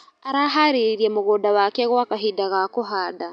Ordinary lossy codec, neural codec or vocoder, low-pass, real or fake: none; none; 9.9 kHz; real